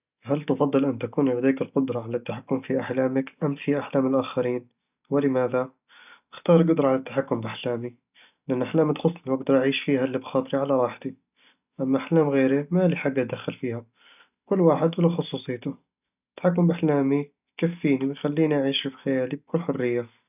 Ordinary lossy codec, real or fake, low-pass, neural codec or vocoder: none; real; 3.6 kHz; none